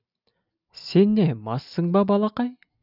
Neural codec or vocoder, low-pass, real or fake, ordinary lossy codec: none; 5.4 kHz; real; none